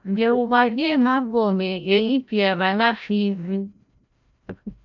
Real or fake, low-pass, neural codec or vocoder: fake; 7.2 kHz; codec, 16 kHz, 0.5 kbps, FreqCodec, larger model